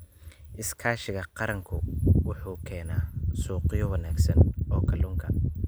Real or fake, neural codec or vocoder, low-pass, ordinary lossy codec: real; none; none; none